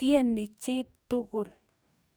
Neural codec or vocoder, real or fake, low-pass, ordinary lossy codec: codec, 44.1 kHz, 2.6 kbps, DAC; fake; none; none